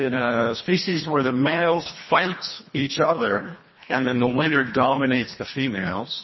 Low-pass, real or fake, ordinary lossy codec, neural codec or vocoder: 7.2 kHz; fake; MP3, 24 kbps; codec, 24 kHz, 1.5 kbps, HILCodec